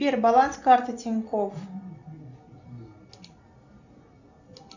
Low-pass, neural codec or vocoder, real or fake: 7.2 kHz; none; real